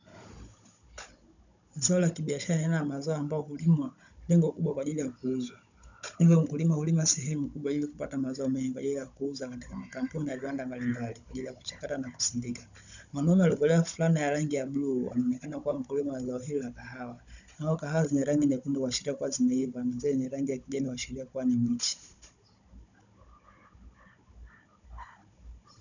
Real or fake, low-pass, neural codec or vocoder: fake; 7.2 kHz; codec, 16 kHz, 16 kbps, FunCodec, trained on Chinese and English, 50 frames a second